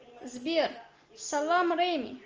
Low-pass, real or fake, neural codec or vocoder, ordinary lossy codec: 7.2 kHz; real; none; Opus, 24 kbps